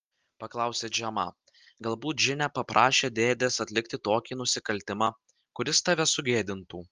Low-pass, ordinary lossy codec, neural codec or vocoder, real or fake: 7.2 kHz; Opus, 32 kbps; none; real